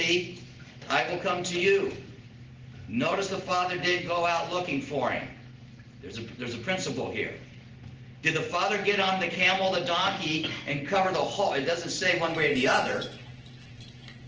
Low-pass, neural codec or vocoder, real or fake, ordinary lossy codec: 7.2 kHz; none; real; Opus, 16 kbps